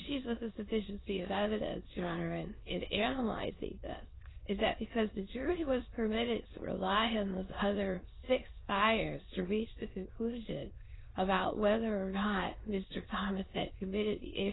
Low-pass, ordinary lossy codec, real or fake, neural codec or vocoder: 7.2 kHz; AAC, 16 kbps; fake; autoencoder, 22.05 kHz, a latent of 192 numbers a frame, VITS, trained on many speakers